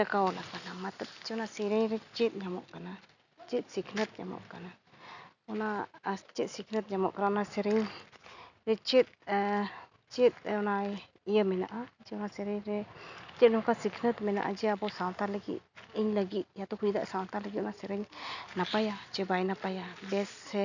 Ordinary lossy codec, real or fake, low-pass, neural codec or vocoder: none; real; 7.2 kHz; none